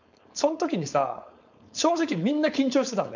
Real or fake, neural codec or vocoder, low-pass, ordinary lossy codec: fake; codec, 16 kHz, 4.8 kbps, FACodec; 7.2 kHz; none